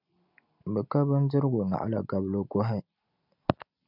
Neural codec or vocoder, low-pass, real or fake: none; 5.4 kHz; real